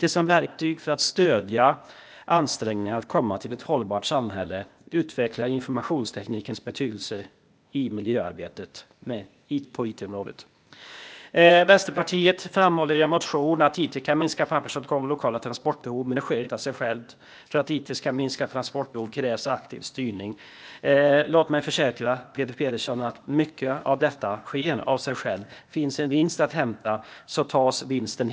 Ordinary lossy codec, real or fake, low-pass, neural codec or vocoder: none; fake; none; codec, 16 kHz, 0.8 kbps, ZipCodec